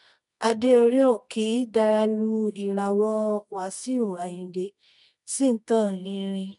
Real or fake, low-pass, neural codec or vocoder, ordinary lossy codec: fake; 10.8 kHz; codec, 24 kHz, 0.9 kbps, WavTokenizer, medium music audio release; none